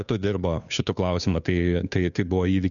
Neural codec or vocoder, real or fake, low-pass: codec, 16 kHz, 2 kbps, FunCodec, trained on Chinese and English, 25 frames a second; fake; 7.2 kHz